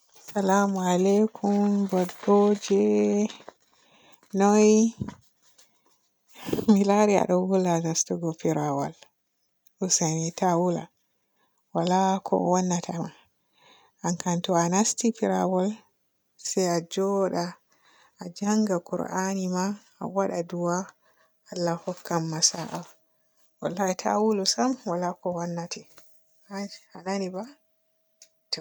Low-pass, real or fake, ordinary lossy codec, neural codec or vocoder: none; real; none; none